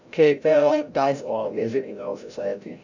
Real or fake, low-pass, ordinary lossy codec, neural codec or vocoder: fake; 7.2 kHz; none; codec, 16 kHz, 0.5 kbps, FreqCodec, larger model